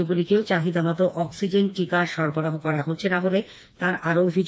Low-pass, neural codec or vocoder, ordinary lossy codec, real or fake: none; codec, 16 kHz, 2 kbps, FreqCodec, smaller model; none; fake